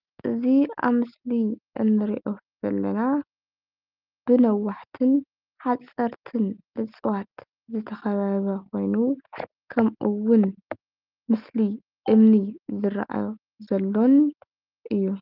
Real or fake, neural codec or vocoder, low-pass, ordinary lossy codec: real; none; 5.4 kHz; Opus, 32 kbps